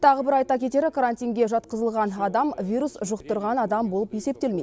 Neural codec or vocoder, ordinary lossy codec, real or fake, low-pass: none; none; real; none